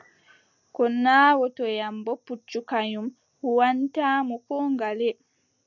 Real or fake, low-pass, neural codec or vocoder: real; 7.2 kHz; none